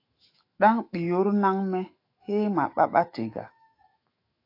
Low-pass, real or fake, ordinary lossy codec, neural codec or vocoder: 5.4 kHz; fake; AAC, 32 kbps; autoencoder, 48 kHz, 128 numbers a frame, DAC-VAE, trained on Japanese speech